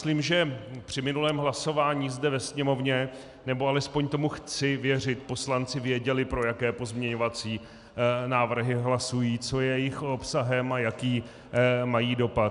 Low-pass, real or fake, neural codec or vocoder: 10.8 kHz; real; none